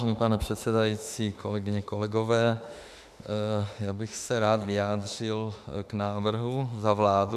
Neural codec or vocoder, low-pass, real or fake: autoencoder, 48 kHz, 32 numbers a frame, DAC-VAE, trained on Japanese speech; 14.4 kHz; fake